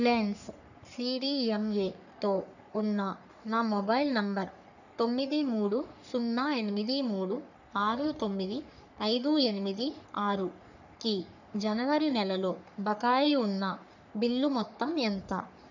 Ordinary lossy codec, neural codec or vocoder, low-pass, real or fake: none; codec, 44.1 kHz, 3.4 kbps, Pupu-Codec; 7.2 kHz; fake